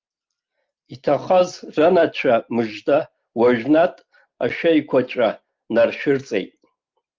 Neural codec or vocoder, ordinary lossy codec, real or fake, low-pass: none; Opus, 32 kbps; real; 7.2 kHz